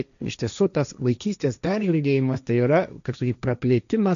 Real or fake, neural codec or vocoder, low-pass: fake; codec, 16 kHz, 1.1 kbps, Voila-Tokenizer; 7.2 kHz